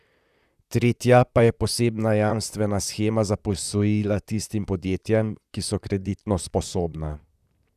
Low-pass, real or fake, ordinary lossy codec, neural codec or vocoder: 14.4 kHz; fake; none; vocoder, 44.1 kHz, 128 mel bands, Pupu-Vocoder